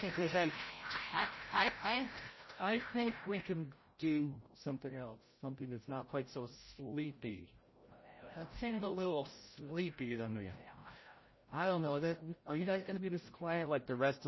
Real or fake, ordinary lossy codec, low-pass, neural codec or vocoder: fake; MP3, 24 kbps; 7.2 kHz; codec, 16 kHz, 0.5 kbps, FreqCodec, larger model